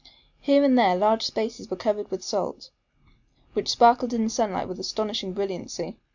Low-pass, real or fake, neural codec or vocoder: 7.2 kHz; real; none